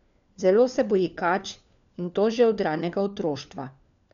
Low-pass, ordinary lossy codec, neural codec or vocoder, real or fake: 7.2 kHz; none; codec, 16 kHz, 4 kbps, FunCodec, trained on LibriTTS, 50 frames a second; fake